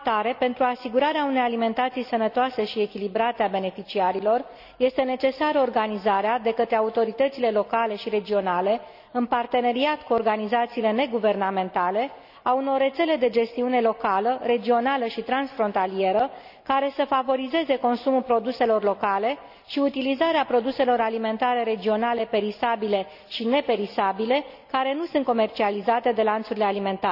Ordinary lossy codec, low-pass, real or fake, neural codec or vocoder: none; 5.4 kHz; real; none